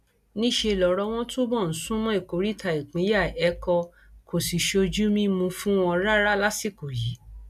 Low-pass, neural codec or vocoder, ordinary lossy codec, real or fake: 14.4 kHz; none; AAC, 96 kbps; real